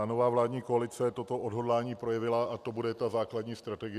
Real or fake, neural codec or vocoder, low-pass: real; none; 14.4 kHz